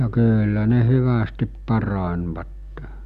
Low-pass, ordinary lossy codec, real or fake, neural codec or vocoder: 14.4 kHz; none; real; none